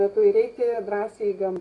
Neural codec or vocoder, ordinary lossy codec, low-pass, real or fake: none; AAC, 32 kbps; 10.8 kHz; real